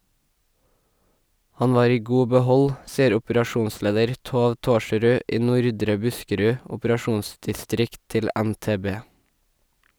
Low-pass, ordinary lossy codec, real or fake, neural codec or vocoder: none; none; real; none